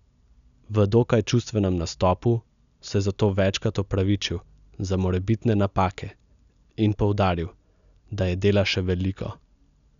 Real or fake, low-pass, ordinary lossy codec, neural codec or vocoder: real; 7.2 kHz; none; none